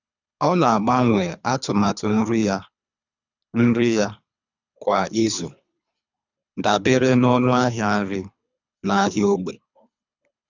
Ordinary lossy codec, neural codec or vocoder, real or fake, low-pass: none; codec, 24 kHz, 3 kbps, HILCodec; fake; 7.2 kHz